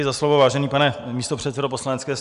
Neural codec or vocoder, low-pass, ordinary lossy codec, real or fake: none; 10.8 kHz; Opus, 64 kbps; real